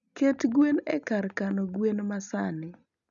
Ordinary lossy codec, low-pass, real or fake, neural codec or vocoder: none; 7.2 kHz; real; none